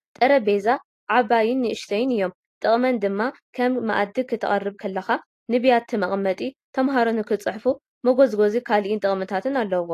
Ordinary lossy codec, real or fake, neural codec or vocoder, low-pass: AAC, 48 kbps; real; none; 14.4 kHz